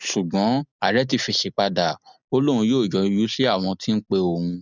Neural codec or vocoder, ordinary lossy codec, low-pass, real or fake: none; none; 7.2 kHz; real